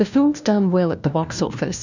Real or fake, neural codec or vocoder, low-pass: fake; codec, 16 kHz, 1 kbps, FunCodec, trained on LibriTTS, 50 frames a second; 7.2 kHz